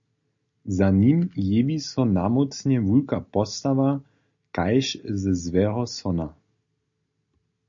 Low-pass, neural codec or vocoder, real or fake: 7.2 kHz; none; real